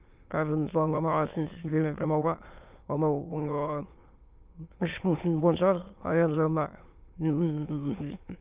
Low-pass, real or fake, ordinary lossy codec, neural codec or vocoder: 3.6 kHz; fake; Opus, 24 kbps; autoencoder, 22.05 kHz, a latent of 192 numbers a frame, VITS, trained on many speakers